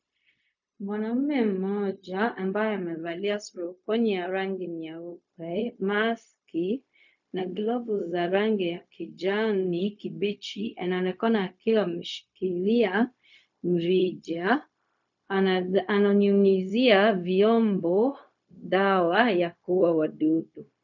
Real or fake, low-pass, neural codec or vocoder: fake; 7.2 kHz; codec, 16 kHz, 0.4 kbps, LongCat-Audio-Codec